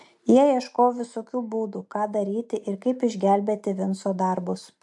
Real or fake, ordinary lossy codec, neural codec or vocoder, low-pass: real; MP3, 64 kbps; none; 10.8 kHz